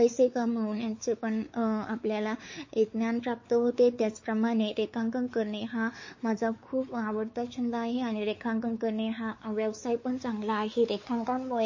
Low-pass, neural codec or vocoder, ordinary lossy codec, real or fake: 7.2 kHz; codec, 16 kHz, 4 kbps, X-Codec, WavLM features, trained on Multilingual LibriSpeech; MP3, 32 kbps; fake